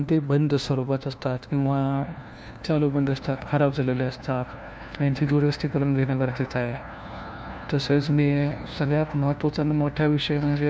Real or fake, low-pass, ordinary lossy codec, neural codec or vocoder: fake; none; none; codec, 16 kHz, 1 kbps, FunCodec, trained on LibriTTS, 50 frames a second